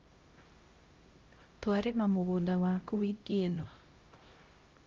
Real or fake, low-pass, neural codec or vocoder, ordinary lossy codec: fake; 7.2 kHz; codec, 16 kHz, 0.5 kbps, X-Codec, HuBERT features, trained on LibriSpeech; Opus, 32 kbps